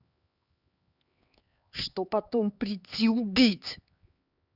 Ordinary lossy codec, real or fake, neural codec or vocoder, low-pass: Opus, 64 kbps; fake; codec, 16 kHz, 4 kbps, X-Codec, HuBERT features, trained on LibriSpeech; 5.4 kHz